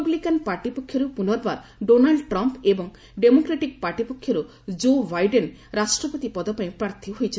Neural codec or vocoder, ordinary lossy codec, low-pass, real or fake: none; none; none; real